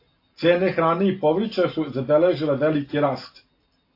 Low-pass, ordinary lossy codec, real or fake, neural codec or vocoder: 5.4 kHz; AAC, 32 kbps; real; none